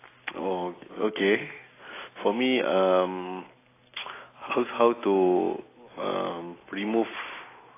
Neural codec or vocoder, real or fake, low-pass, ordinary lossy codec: none; real; 3.6 kHz; AAC, 16 kbps